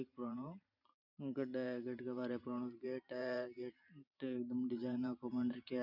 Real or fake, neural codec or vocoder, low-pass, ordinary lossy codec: fake; vocoder, 44.1 kHz, 128 mel bands every 512 samples, BigVGAN v2; 5.4 kHz; none